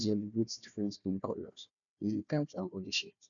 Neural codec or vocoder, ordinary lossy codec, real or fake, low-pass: codec, 16 kHz, 1 kbps, FunCodec, trained on Chinese and English, 50 frames a second; none; fake; 7.2 kHz